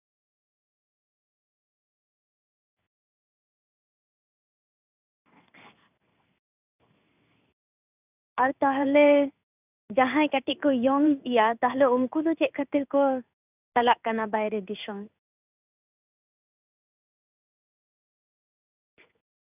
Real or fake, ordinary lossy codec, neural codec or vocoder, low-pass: fake; none; codec, 16 kHz in and 24 kHz out, 1 kbps, XY-Tokenizer; 3.6 kHz